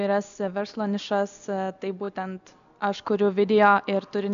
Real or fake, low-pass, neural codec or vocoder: real; 7.2 kHz; none